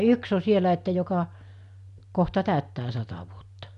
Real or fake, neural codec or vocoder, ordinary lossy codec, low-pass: real; none; none; 10.8 kHz